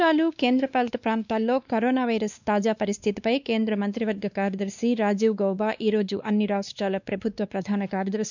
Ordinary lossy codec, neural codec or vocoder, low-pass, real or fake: none; codec, 16 kHz, 2 kbps, X-Codec, WavLM features, trained on Multilingual LibriSpeech; 7.2 kHz; fake